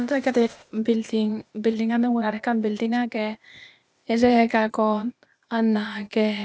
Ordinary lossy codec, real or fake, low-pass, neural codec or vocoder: none; fake; none; codec, 16 kHz, 0.8 kbps, ZipCodec